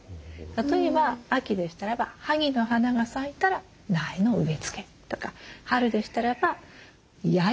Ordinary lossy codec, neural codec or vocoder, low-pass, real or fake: none; none; none; real